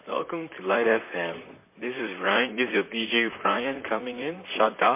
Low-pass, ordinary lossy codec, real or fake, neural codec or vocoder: 3.6 kHz; MP3, 24 kbps; fake; vocoder, 44.1 kHz, 128 mel bands, Pupu-Vocoder